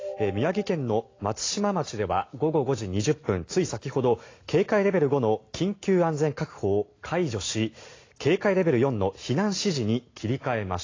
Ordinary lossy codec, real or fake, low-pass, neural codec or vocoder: AAC, 32 kbps; real; 7.2 kHz; none